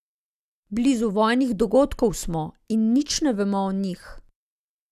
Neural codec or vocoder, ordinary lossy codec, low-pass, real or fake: none; Opus, 64 kbps; 14.4 kHz; real